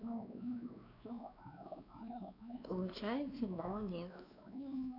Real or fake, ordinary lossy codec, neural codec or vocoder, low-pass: fake; none; codec, 16 kHz, 2 kbps, X-Codec, WavLM features, trained on Multilingual LibriSpeech; 5.4 kHz